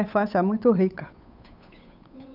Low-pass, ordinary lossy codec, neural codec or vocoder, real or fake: 5.4 kHz; none; codec, 16 kHz, 8 kbps, FunCodec, trained on Chinese and English, 25 frames a second; fake